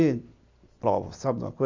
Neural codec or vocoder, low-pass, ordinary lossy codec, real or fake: none; 7.2 kHz; MP3, 64 kbps; real